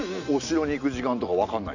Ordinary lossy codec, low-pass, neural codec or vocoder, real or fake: none; 7.2 kHz; none; real